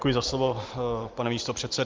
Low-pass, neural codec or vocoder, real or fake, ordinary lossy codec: 7.2 kHz; none; real; Opus, 32 kbps